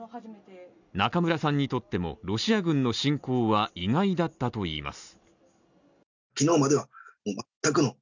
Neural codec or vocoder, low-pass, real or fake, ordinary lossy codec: none; 7.2 kHz; real; none